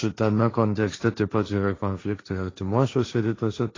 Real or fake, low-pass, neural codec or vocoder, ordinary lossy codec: fake; 7.2 kHz; codec, 16 kHz, 1.1 kbps, Voila-Tokenizer; AAC, 32 kbps